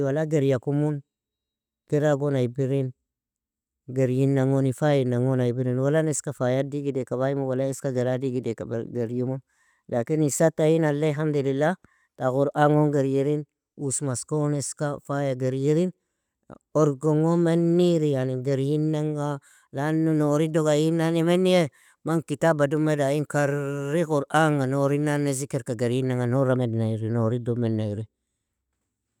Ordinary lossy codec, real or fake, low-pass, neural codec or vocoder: none; real; 19.8 kHz; none